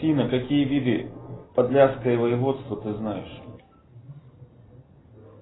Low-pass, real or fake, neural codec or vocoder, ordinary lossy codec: 7.2 kHz; real; none; AAC, 16 kbps